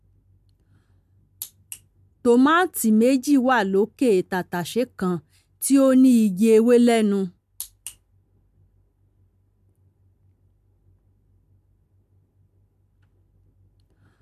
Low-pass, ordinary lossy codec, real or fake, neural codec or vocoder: 14.4 kHz; MP3, 96 kbps; real; none